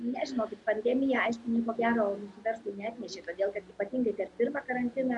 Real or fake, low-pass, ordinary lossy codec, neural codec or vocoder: real; 10.8 kHz; MP3, 96 kbps; none